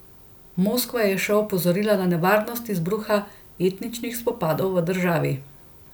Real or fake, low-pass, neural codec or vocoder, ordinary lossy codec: real; none; none; none